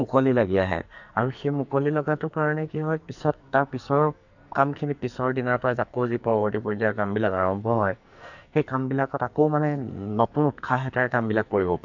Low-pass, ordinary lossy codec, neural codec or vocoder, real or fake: 7.2 kHz; none; codec, 44.1 kHz, 2.6 kbps, SNAC; fake